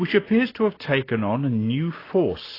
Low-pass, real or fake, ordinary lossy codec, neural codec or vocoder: 5.4 kHz; real; AAC, 24 kbps; none